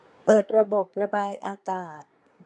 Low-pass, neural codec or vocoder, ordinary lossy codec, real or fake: 10.8 kHz; codec, 24 kHz, 1 kbps, SNAC; none; fake